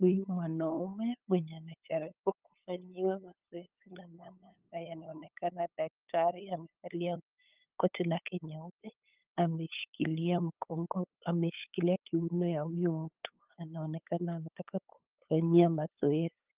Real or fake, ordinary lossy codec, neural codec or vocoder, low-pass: fake; Opus, 32 kbps; codec, 16 kHz, 8 kbps, FunCodec, trained on LibriTTS, 25 frames a second; 3.6 kHz